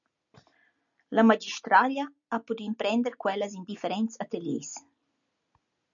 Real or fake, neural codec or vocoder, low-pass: real; none; 7.2 kHz